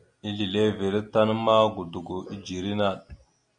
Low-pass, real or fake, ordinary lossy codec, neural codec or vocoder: 9.9 kHz; real; AAC, 64 kbps; none